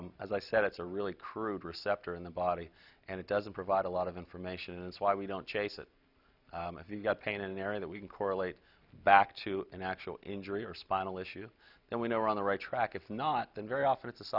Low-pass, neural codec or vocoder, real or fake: 5.4 kHz; none; real